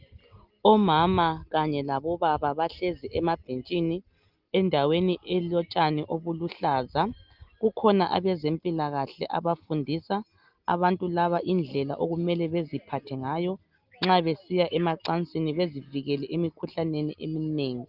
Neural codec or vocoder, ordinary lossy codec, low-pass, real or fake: none; Opus, 32 kbps; 5.4 kHz; real